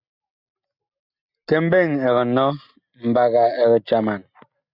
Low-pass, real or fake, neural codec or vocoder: 5.4 kHz; real; none